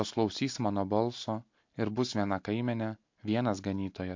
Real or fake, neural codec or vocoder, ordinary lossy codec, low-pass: real; none; MP3, 48 kbps; 7.2 kHz